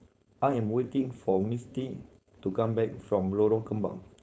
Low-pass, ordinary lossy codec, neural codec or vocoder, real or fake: none; none; codec, 16 kHz, 4.8 kbps, FACodec; fake